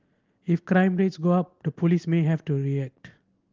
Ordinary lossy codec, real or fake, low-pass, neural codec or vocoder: Opus, 16 kbps; real; 7.2 kHz; none